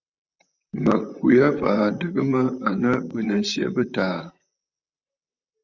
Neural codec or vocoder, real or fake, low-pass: vocoder, 44.1 kHz, 128 mel bands, Pupu-Vocoder; fake; 7.2 kHz